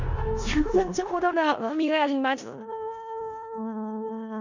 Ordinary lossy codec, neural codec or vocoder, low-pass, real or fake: none; codec, 16 kHz in and 24 kHz out, 0.4 kbps, LongCat-Audio-Codec, four codebook decoder; 7.2 kHz; fake